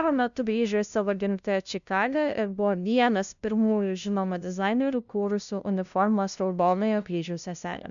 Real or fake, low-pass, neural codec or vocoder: fake; 7.2 kHz; codec, 16 kHz, 0.5 kbps, FunCodec, trained on LibriTTS, 25 frames a second